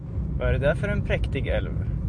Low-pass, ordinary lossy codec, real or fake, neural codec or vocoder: 9.9 kHz; MP3, 64 kbps; real; none